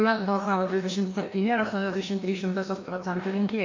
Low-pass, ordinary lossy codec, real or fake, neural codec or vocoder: 7.2 kHz; MP3, 48 kbps; fake; codec, 16 kHz, 1 kbps, FreqCodec, larger model